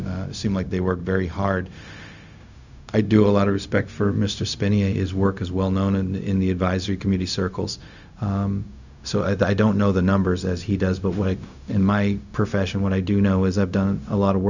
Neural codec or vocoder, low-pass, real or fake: codec, 16 kHz, 0.4 kbps, LongCat-Audio-Codec; 7.2 kHz; fake